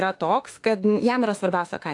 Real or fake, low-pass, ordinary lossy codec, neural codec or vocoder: fake; 10.8 kHz; AAC, 48 kbps; autoencoder, 48 kHz, 32 numbers a frame, DAC-VAE, trained on Japanese speech